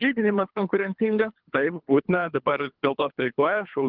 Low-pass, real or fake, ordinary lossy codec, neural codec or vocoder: 5.4 kHz; fake; Opus, 32 kbps; codec, 24 kHz, 3 kbps, HILCodec